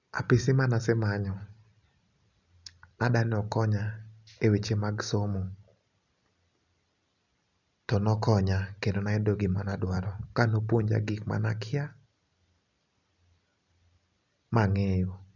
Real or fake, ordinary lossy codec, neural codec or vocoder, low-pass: real; none; none; 7.2 kHz